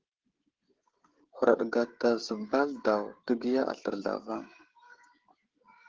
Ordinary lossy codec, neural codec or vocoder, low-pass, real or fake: Opus, 16 kbps; codec, 16 kHz, 16 kbps, FreqCodec, smaller model; 7.2 kHz; fake